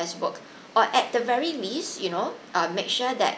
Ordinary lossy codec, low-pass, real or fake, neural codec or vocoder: none; none; real; none